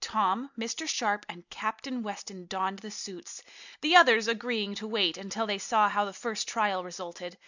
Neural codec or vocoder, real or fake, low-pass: none; real; 7.2 kHz